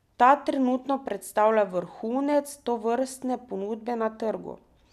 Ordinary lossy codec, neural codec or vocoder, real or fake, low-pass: none; none; real; 14.4 kHz